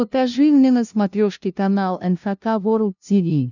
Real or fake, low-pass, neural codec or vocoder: fake; 7.2 kHz; codec, 16 kHz, 0.5 kbps, FunCodec, trained on LibriTTS, 25 frames a second